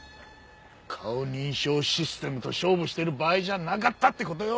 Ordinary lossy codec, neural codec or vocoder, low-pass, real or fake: none; none; none; real